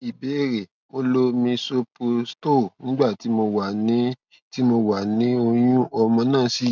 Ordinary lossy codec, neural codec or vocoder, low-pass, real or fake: none; none; 7.2 kHz; real